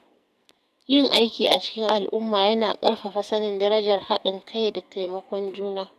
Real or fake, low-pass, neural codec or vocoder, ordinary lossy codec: fake; 14.4 kHz; codec, 44.1 kHz, 2.6 kbps, SNAC; AAC, 96 kbps